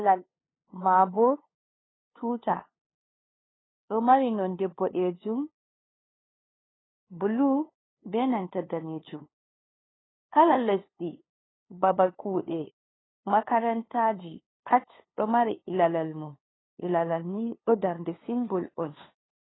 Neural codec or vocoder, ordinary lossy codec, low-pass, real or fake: codec, 16 kHz, 2 kbps, FunCodec, trained on LibriTTS, 25 frames a second; AAC, 16 kbps; 7.2 kHz; fake